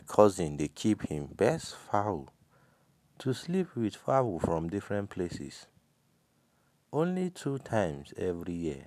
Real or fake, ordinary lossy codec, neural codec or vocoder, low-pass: real; none; none; 14.4 kHz